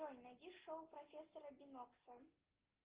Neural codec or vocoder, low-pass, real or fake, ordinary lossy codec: none; 3.6 kHz; real; Opus, 16 kbps